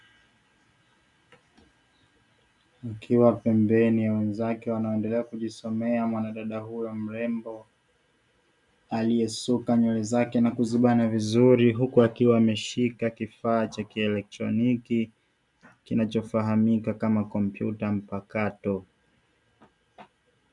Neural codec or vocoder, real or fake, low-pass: none; real; 10.8 kHz